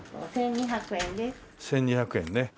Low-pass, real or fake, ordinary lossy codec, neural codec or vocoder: none; real; none; none